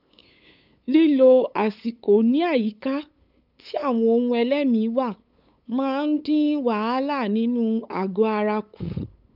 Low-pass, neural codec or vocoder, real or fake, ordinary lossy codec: 5.4 kHz; codec, 16 kHz, 8 kbps, FunCodec, trained on LibriTTS, 25 frames a second; fake; none